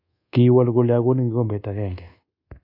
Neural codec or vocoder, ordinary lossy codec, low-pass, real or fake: codec, 24 kHz, 1.2 kbps, DualCodec; AAC, 32 kbps; 5.4 kHz; fake